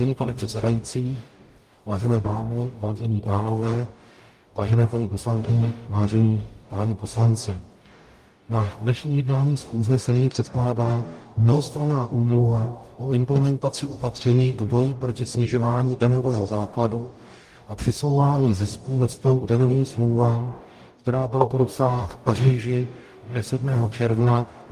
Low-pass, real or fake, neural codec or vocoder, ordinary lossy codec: 14.4 kHz; fake; codec, 44.1 kHz, 0.9 kbps, DAC; Opus, 32 kbps